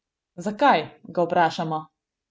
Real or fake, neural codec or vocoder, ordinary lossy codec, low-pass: real; none; none; none